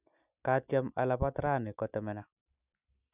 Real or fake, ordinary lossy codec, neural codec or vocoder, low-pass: real; none; none; 3.6 kHz